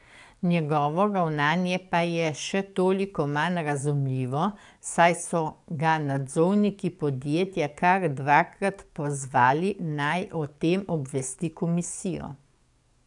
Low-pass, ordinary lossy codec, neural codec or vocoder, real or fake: 10.8 kHz; none; codec, 44.1 kHz, 7.8 kbps, DAC; fake